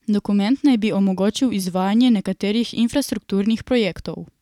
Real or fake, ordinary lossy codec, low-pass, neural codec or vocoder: fake; none; 19.8 kHz; vocoder, 44.1 kHz, 128 mel bands every 512 samples, BigVGAN v2